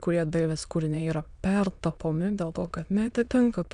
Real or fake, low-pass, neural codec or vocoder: fake; 9.9 kHz; autoencoder, 22.05 kHz, a latent of 192 numbers a frame, VITS, trained on many speakers